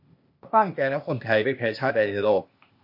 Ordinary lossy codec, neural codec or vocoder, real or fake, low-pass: MP3, 32 kbps; codec, 16 kHz, 0.8 kbps, ZipCodec; fake; 5.4 kHz